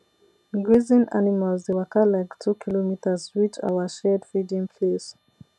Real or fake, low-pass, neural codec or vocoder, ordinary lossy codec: real; none; none; none